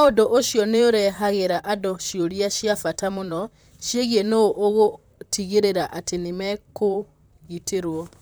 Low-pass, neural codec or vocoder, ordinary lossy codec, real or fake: none; vocoder, 44.1 kHz, 128 mel bands, Pupu-Vocoder; none; fake